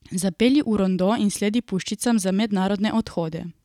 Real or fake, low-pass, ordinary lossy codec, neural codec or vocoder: real; 19.8 kHz; none; none